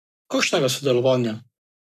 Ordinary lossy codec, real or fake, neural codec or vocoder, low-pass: none; fake; codec, 44.1 kHz, 7.8 kbps, Pupu-Codec; 14.4 kHz